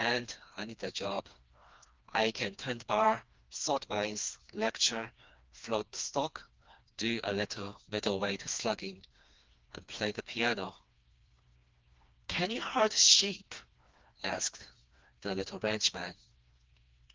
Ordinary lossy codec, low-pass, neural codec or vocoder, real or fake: Opus, 24 kbps; 7.2 kHz; codec, 16 kHz, 2 kbps, FreqCodec, smaller model; fake